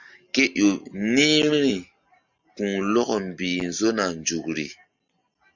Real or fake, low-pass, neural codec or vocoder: real; 7.2 kHz; none